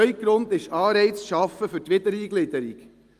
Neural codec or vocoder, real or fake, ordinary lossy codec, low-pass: none; real; Opus, 32 kbps; 14.4 kHz